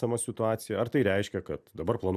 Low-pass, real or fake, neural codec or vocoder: 14.4 kHz; real; none